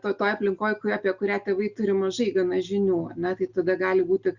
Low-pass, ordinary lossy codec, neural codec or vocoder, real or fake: 7.2 kHz; Opus, 64 kbps; none; real